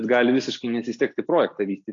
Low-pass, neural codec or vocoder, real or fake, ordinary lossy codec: 7.2 kHz; none; real; AAC, 48 kbps